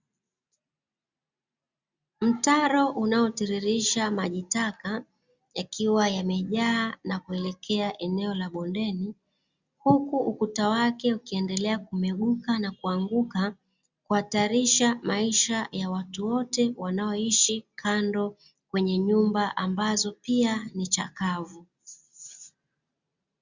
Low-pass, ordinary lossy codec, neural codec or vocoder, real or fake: 7.2 kHz; Opus, 64 kbps; none; real